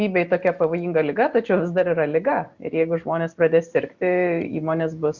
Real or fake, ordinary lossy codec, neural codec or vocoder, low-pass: real; Opus, 64 kbps; none; 7.2 kHz